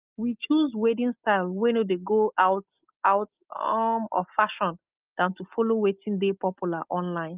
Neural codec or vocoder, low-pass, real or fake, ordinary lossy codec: none; 3.6 kHz; real; Opus, 24 kbps